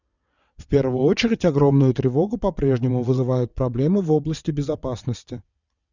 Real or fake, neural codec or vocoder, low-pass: fake; vocoder, 22.05 kHz, 80 mel bands, WaveNeXt; 7.2 kHz